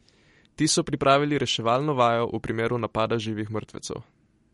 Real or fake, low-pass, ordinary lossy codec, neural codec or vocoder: real; 19.8 kHz; MP3, 48 kbps; none